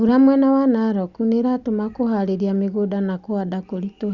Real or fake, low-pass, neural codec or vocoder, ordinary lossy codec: real; 7.2 kHz; none; Opus, 64 kbps